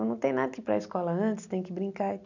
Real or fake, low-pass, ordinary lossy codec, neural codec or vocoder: real; 7.2 kHz; none; none